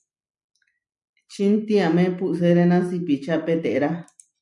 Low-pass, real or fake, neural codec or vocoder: 10.8 kHz; real; none